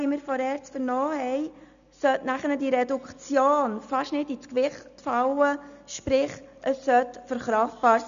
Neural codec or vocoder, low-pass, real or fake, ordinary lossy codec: none; 7.2 kHz; real; none